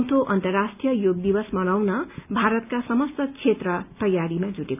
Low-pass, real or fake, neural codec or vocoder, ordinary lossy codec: 3.6 kHz; real; none; none